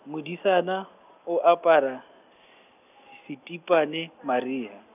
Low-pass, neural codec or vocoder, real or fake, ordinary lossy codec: 3.6 kHz; none; real; none